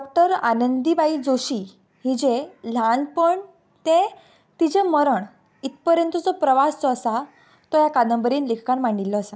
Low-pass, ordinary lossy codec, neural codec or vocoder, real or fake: none; none; none; real